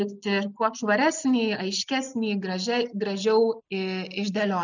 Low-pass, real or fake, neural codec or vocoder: 7.2 kHz; real; none